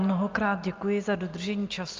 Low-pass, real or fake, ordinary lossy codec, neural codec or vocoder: 7.2 kHz; real; Opus, 24 kbps; none